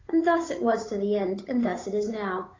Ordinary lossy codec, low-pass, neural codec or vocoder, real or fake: AAC, 32 kbps; 7.2 kHz; codec, 16 kHz, 8 kbps, FunCodec, trained on Chinese and English, 25 frames a second; fake